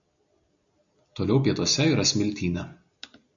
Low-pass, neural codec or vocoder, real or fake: 7.2 kHz; none; real